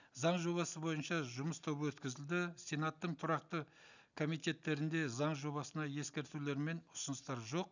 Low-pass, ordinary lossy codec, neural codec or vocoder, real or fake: 7.2 kHz; none; none; real